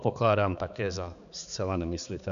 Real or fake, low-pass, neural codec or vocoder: fake; 7.2 kHz; codec, 16 kHz, 4 kbps, X-Codec, HuBERT features, trained on balanced general audio